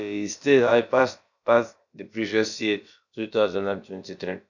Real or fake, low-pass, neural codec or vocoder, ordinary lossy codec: fake; 7.2 kHz; codec, 16 kHz, about 1 kbps, DyCAST, with the encoder's durations; none